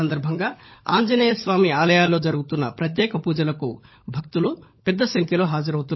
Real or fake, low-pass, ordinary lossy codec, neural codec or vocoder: fake; 7.2 kHz; MP3, 24 kbps; codec, 16 kHz, 16 kbps, FunCodec, trained on LibriTTS, 50 frames a second